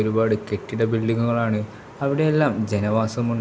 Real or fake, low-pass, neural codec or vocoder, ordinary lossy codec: real; none; none; none